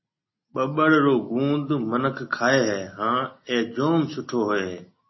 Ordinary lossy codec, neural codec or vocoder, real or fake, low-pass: MP3, 24 kbps; none; real; 7.2 kHz